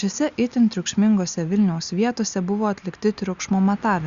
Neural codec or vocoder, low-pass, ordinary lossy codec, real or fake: none; 7.2 kHz; Opus, 64 kbps; real